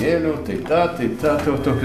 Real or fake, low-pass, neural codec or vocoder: real; 14.4 kHz; none